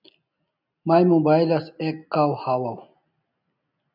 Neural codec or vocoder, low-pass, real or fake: none; 5.4 kHz; real